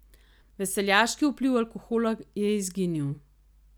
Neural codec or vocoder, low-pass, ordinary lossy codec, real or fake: none; none; none; real